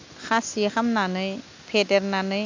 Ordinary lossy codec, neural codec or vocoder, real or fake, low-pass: MP3, 64 kbps; none; real; 7.2 kHz